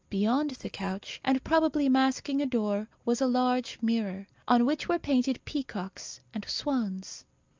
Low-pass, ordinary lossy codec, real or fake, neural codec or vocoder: 7.2 kHz; Opus, 24 kbps; real; none